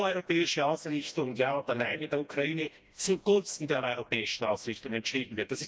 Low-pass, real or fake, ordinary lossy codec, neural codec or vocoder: none; fake; none; codec, 16 kHz, 1 kbps, FreqCodec, smaller model